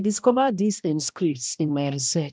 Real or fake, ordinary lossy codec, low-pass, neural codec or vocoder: fake; none; none; codec, 16 kHz, 1 kbps, X-Codec, HuBERT features, trained on balanced general audio